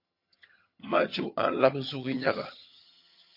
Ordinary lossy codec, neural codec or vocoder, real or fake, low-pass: MP3, 32 kbps; vocoder, 22.05 kHz, 80 mel bands, HiFi-GAN; fake; 5.4 kHz